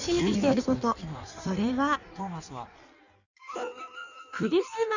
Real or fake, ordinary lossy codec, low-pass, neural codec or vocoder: fake; none; 7.2 kHz; codec, 16 kHz in and 24 kHz out, 1.1 kbps, FireRedTTS-2 codec